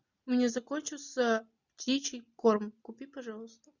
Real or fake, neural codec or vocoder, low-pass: real; none; 7.2 kHz